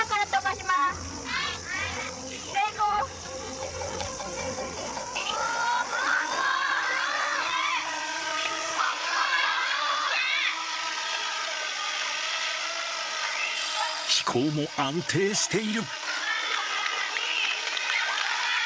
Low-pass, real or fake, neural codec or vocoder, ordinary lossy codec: none; fake; codec, 16 kHz, 8 kbps, FreqCodec, larger model; none